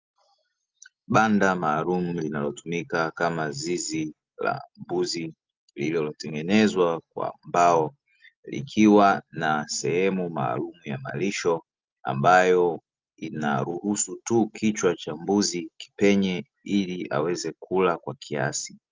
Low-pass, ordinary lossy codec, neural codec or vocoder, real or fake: 7.2 kHz; Opus, 32 kbps; none; real